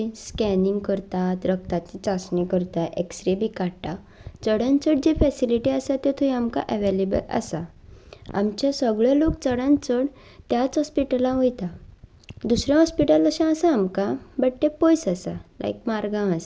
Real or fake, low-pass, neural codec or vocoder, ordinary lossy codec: real; none; none; none